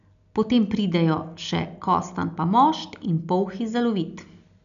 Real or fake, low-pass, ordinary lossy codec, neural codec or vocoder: real; 7.2 kHz; MP3, 96 kbps; none